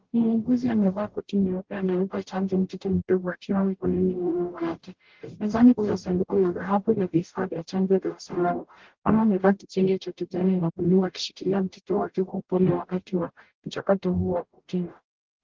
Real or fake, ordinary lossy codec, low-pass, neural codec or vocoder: fake; Opus, 16 kbps; 7.2 kHz; codec, 44.1 kHz, 0.9 kbps, DAC